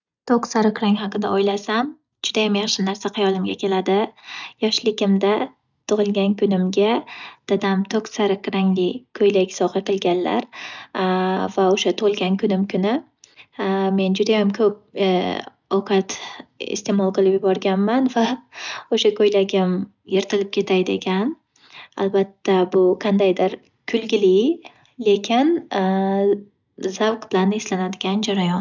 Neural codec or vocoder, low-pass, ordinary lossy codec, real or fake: none; 7.2 kHz; none; real